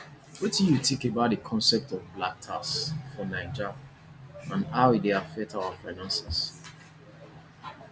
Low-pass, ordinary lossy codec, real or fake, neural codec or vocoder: none; none; real; none